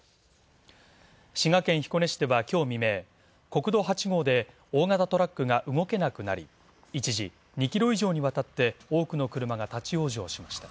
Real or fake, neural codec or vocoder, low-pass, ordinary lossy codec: real; none; none; none